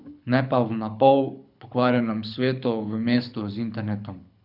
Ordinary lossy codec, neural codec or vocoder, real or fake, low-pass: none; codec, 24 kHz, 6 kbps, HILCodec; fake; 5.4 kHz